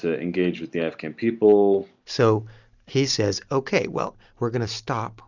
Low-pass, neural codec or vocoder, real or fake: 7.2 kHz; none; real